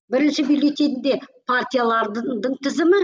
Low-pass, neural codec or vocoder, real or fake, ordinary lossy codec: none; none; real; none